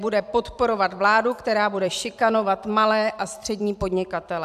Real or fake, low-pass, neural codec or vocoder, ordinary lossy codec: fake; 14.4 kHz; vocoder, 44.1 kHz, 128 mel bands every 256 samples, BigVGAN v2; AAC, 96 kbps